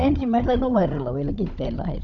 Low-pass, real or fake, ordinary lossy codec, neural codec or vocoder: 7.2 kHz; fake; none; codec, 16 kHz, 16 kbps, FunCodec, trained on Chinese and English, 50 frames a second